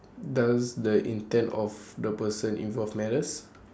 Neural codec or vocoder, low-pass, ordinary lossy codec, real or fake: none; none; none; real